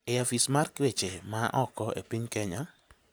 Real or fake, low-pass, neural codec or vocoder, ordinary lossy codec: real; none; none; none